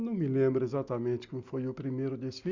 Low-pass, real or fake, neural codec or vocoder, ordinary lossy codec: 7.2 kHz; real; none; Opus, 64 kbps